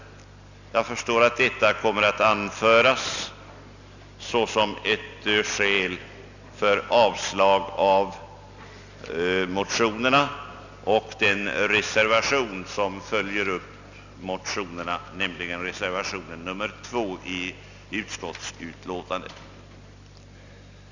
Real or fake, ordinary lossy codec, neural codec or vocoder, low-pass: real; none; none; 7.2 kHz